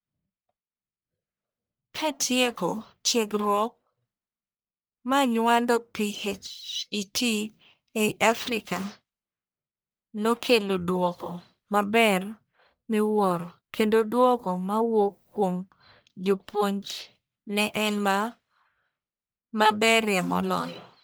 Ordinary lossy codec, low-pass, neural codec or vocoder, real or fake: none; none; codec, 44.1 kHz, 1.7 kbps, Pupu-Codec; fake